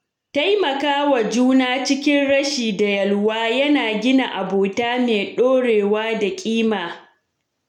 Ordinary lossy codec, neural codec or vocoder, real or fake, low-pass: none; none; real; 19.8 kHz